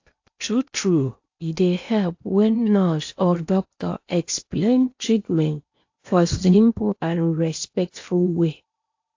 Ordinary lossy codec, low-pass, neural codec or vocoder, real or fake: AAC, 48 kbps; 7.2 kHz; codec, 16 kHz in and 24 kHz out, 0.8 kbps, FocalCodec, streaming, 65536 codes; fake